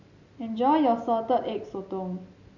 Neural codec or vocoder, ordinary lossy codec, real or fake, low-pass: none; none; real; 7.2 kHz